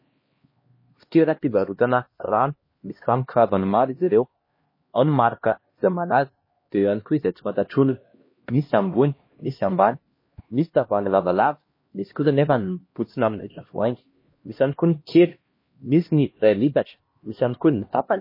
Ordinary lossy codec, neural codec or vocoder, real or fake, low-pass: MP3, 24 kbps; codec, 16 kHz, 1 kbps, X-Codec, HuBERT features, trained on LibriSpeech; fake; 5.4 kHz